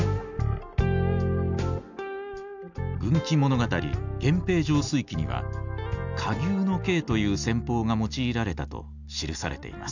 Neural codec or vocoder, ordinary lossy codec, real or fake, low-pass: none; none; real; 7.2 kHz